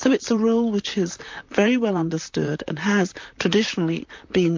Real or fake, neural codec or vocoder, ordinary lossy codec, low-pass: fake; vocoder, 44.1 kHz, 128 mel bands, Pupu-Vocoder; MP3, 48 kbps; 7.2 kHz